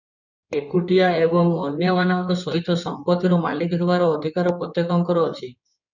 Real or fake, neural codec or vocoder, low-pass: fake; codec, 16 kHz in and 24 kHz out, 2.2 kbps, FireRedTTS-2 codec; 7.2 kHz